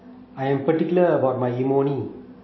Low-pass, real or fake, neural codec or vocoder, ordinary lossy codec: 7.2 kHz; real; none; MP3, 24 kbps